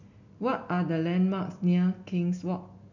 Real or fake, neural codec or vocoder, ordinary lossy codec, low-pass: real; none; none; 7.2 kHz